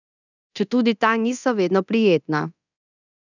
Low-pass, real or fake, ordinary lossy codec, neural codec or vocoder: 7.2 kHz; fake; none; codec, 24 kHz, 0.9 kbps, DualCodec